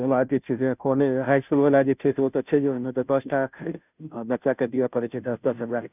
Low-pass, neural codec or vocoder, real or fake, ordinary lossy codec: 3.6 kHz; codec, 16 kHz, 0.5 kbps, FunCodec, trained on Chinese and English, 25 frames a second; fake; none